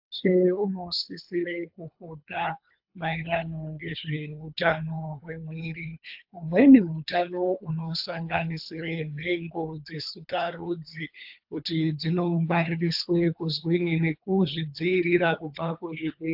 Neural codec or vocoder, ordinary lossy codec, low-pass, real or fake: codec, 24 kHz, 3 kbps, HILCodec; AAC, 48 kbps; 5.4 kHz; fake